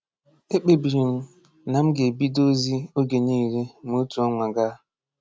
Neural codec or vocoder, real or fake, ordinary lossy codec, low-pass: none; real; none; none